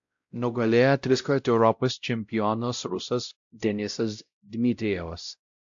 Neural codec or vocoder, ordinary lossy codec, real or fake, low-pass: codec, 16 kHz, 0.5 kbps, X-Codec, WavLM features, trained on Multilingual LibriSpeech; AAC, 64 kbps; fake; 7.2 kHz